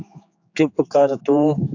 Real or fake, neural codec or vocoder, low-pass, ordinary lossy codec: fake; codec, 16 kHz, 4 kbps, X-Codec, HuBERT features, trained on general audio; 7.2 kHz; AAC, 48 kbps